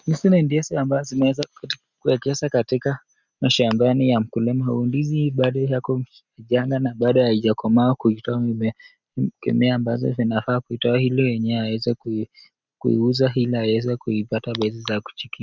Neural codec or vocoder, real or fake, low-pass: none; real; 7.2 kHz